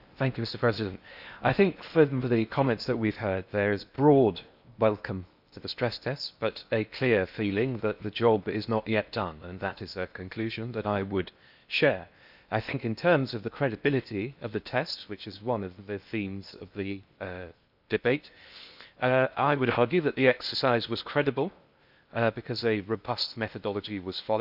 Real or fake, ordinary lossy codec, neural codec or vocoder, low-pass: fake; none; codec, 16 kHz in and 24 kHz out, 0.8 kbps, FocalCodec, streaming, 65536 codes; 5.4 kHz